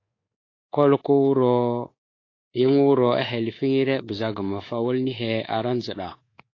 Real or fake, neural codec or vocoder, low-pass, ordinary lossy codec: fake; codec, 24 kHz, 3.1 kbps, DualCodec; 7.2 kHz; AAC, 32 kbps